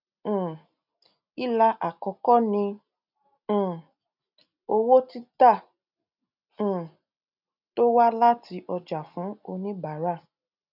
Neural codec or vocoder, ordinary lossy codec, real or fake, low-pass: none; none; real; 5.4 kHz